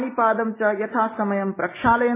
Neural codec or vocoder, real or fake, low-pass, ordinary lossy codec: none; real; 3.6 kHz; MP3, 16 kbps